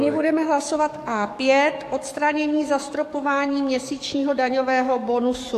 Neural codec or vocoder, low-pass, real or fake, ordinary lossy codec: codec, 44.1 kHz, 7.8 kbps, DAC; 14.4 kHz; fake; AAC, 64 kbps